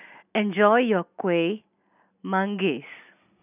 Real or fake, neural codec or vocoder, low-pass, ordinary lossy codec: real; none; 3.6 kHz; none